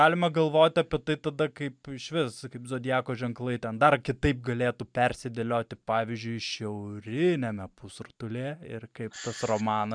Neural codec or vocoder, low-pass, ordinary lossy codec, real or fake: none; 9.9 kHz; MP3, 96 kbps; real